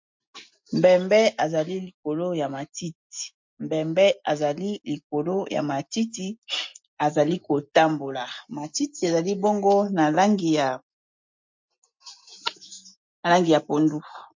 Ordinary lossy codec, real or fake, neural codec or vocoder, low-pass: MP3, 48 kbps; real; none; 7.2 kHz